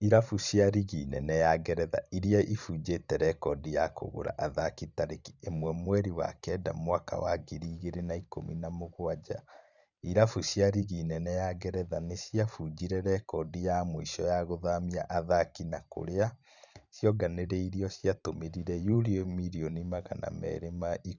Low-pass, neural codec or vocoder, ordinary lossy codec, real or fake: 7.2 kHz; vocoder, 44.1 kHz, 128 mel bands every 512 samples, BigVGAN v2; none; fake